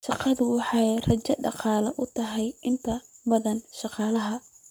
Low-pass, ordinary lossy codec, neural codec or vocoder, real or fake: none; none; codec, 44.1 kHz, 7.8 kbps, Pupu-Codec; fake